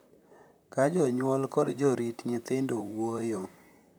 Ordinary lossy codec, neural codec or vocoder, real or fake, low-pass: none; vocoder, 44.1 kHz, 128 mel bands, Pupu-Vocoder; fake; none